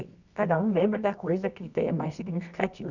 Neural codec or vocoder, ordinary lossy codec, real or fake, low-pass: codec, 24 kHz, 0.9 kbps, WavTokenizer, medium music audio release; none; fake; 7.2 kHz